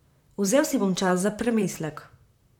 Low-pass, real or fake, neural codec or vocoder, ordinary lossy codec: 19.8 kHz; fake; vocoder, 44.1 kHz, 128 mel bands, Pupu-Vocoder; MP3, 96 kbps